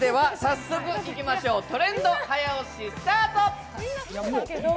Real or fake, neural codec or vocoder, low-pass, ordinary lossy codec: real; none; none; none